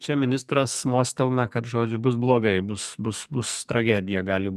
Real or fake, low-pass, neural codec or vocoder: fake; 14.4 kHz; codec, 44.1 kHz, 2.6 kbps, SNAC